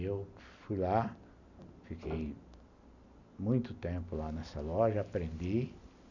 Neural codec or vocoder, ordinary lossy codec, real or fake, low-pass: none; none; real; 7.2 kHz